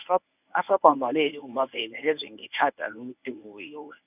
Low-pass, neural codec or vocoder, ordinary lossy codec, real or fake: 3.6 kHz; codec, 24 kHz, 0.9 kbps, WavTokenizer, medium speech release version 1; none; fake